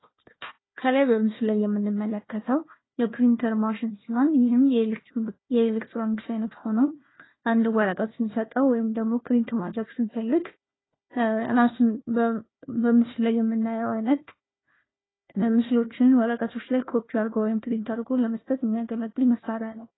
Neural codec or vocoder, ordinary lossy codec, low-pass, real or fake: codec, 16 kHz, 1 kbps, FunCodec, trained on Chinese and English, 50 frames a second; AAC, 16 kbps; 7.2 kHz; fake